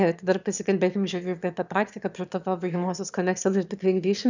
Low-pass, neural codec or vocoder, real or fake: 7.2 kHz; autoencoder, 22.05 kHz, a latent of 192 numbers a frame, VITS, trained on one speaker; fake